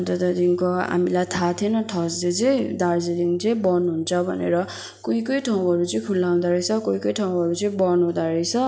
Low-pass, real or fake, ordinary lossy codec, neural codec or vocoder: none; real; none; none